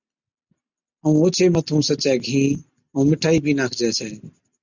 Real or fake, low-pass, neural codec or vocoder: real; 7.2 kHz; none